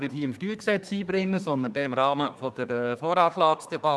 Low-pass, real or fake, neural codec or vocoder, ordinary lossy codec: none; fake; codec, 24 kHz, 1 kbps, SNAC; none